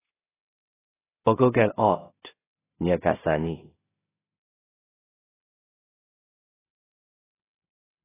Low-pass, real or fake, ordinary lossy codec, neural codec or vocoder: 3.6 kHz; fake; AAC, 16 kbps; codec, 16 kHz in and 24 kHz out, 0.4 kbps, LongCat-Audio-Codec, two codebook decoder